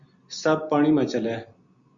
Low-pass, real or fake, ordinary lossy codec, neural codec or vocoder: 7.2 kHz; real; Opus, 64 kbps; none